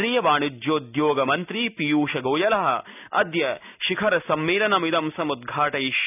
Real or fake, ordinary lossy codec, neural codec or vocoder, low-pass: real; none; none; 3.6 kHz